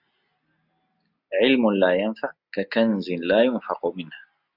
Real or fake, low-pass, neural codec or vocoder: real; 5.4 kHz; none